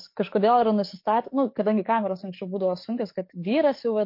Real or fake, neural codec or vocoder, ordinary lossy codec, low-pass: fake; codec, 44.1 kHz, 7.8 kbps, DAC; MP3, 32 kbps; 5.4 kHz